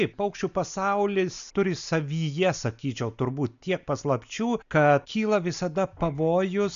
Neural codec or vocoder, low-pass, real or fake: none; 7.2 kHz; real